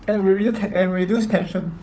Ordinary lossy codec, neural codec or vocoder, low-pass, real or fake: none; codec, 16 kHz, 4 kbps, FunCodec, trained on Chinese and English, 50 frames a second; none; fake